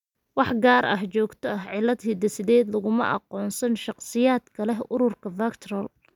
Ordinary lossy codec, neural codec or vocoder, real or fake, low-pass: none; none; real; 19.8 kHz